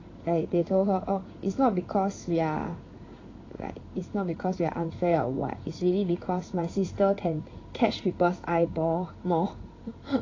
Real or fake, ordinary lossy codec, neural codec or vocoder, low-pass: fake; AAC, 32 kbps; codec, 16 kHz in and 24 kHz out, 1 kbps, XY-Tokenizer; 7.2 kHz